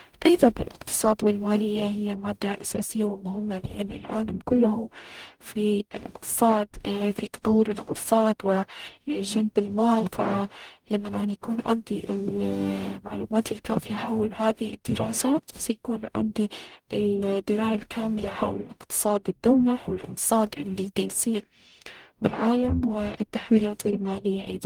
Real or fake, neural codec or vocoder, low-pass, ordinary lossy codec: fake; codec, 44.1 kHz, 0.9 kbps, DAC; 19.8 kHz; Opus, 32 kbps